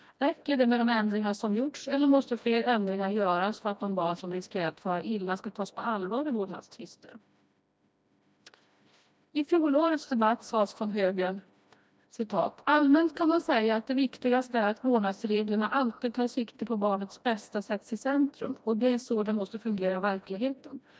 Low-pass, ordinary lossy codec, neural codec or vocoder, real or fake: none; none; codec, 16 kHz, 1 kbps, FreqCodec, smaller model; fake